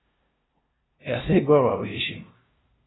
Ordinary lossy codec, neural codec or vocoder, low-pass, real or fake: AAC, 16 kbps; codec, 16 kHz, 0.5 kbps, FunCodec, trained on LibriTTS, 25 frames a second; 7.2 kHz; fake